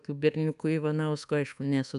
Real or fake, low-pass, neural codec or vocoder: fake; 10.8 kHz; codec, 24 kHz, 1.2 kbps, DualCodec